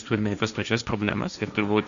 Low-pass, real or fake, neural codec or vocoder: 7.2 kHz; fake; codec, 16 kHz, 1.1 kbps, Voila-Tokenizer